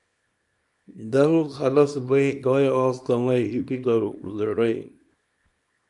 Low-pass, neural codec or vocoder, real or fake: 10.8 kHz; codec, 24 kHz, 0.9 kbps, WavTokenizer, small release; fake